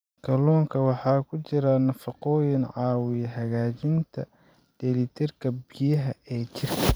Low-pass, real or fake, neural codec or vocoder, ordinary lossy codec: none; fake; vocoder, 44.1 kHz, 128 mel bands every 512 samples, BigVGAN v2; none